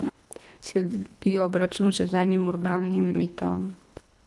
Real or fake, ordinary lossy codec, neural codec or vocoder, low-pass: fake; none; codec, 24 kHz, 1.5 kbps, HILCodec; none